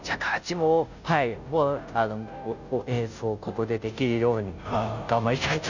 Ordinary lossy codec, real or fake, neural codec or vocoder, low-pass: none; fake; codec, 16 kHz, 0.5 kbps, FunCodec, trained on Chinese and English, 25 frames a second; 7.2 kHz